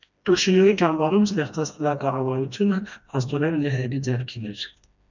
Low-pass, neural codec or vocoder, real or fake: 7.2 kHz; codec, 16 kHz, 1 kbps, FreqCodec, smaller model; fake